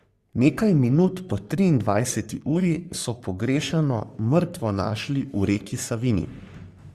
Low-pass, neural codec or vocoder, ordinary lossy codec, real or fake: 14.4 kHz; codec, 44.1 kHz, 3.4 kbps, Pupu-Codec; Opus, 64 kbps; fake